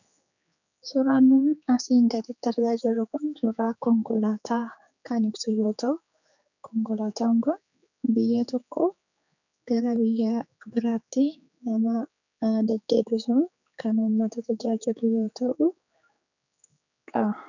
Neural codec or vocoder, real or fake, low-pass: codec, 16 kHz, 4 kbps, X-Codec, HuBERT features, trained on general audio; fake; 7.2 kHz